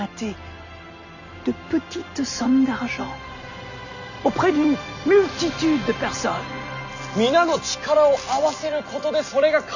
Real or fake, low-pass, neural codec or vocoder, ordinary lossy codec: real; 7.2 kHz; none; none